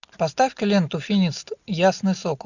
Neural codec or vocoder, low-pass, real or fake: none; 7.2 kHz; real